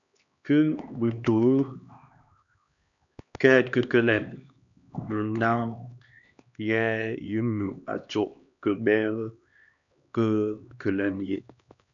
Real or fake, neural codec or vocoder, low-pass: fake; codec, 16 kHz, 2 kbps, X-Codec, HuBERT features, trained on LibriSpeech; 7.2 kHz